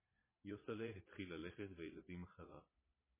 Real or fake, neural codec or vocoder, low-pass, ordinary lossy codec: fake; vocoder, 22.05 kHz, 80 mel bands, Vocos; 3.6 kHz; MP3, 16 kbps